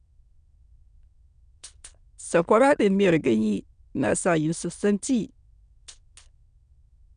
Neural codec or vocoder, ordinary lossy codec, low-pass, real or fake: autoencoder, 22.05 kHz, a latent of 192 numbers a frame, VITS, trained on many speakers; none; 9.9 kHz; fake